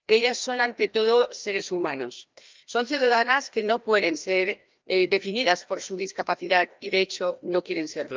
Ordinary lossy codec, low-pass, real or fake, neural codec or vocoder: Opus, 24 kbps; 7.2 kHz; fake; codec, 16 kHz, 1 kbps, FreqCodec, larger model